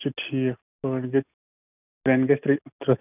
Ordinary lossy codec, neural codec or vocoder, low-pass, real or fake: none; none; 3.6 kHz; real